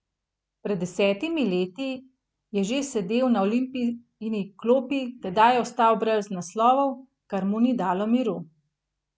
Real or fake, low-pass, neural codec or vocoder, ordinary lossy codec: real; none; none; none